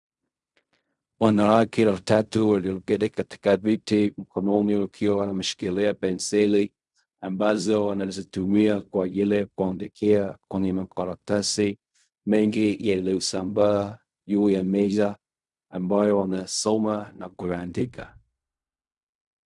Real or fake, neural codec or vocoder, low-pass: fake; codec, 16 kHz in and 24 kHz out, 0.4 kbps, LongCat-Audio-Codec, fine tuned four codebook decoder; 10.8 kHz